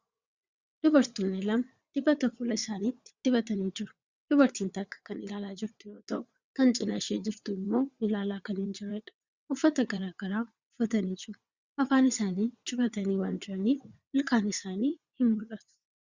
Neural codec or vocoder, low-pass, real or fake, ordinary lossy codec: vocoder, 22.05 kHz, 80 mel bands, WaveNeXt; 7.2 kHz; fake; Opus, 64 kbps